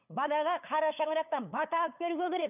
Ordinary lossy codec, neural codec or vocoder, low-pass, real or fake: none; codec, 16 kHz, 8 kbps, FunCodec, trained on LibriTTS, 25 frames a second; 3.6 kHz; fake